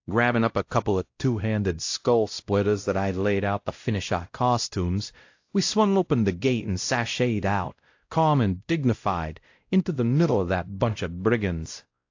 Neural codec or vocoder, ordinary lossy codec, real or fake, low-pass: codec, 16 kHz, 0.5 kbps, X-Codec, WavLM features, trained on Multilingual LibriSpeech; AAC, 48 kbps; fake; 7.2 kHz